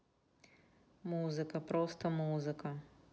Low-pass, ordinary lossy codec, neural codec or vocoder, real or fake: none; none; none; real